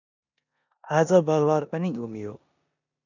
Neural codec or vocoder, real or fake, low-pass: codec, 16 kHz in and 24 kHz out, 0.9 kbps, LongCat-Audio-Codec, four codebook decoder; fake; 7.2 kHz